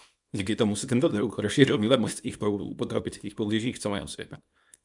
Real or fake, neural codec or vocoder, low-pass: fake; codec, 24 kHz, 0.9 kbps, WavTokenizer, small release; 10.8 kHz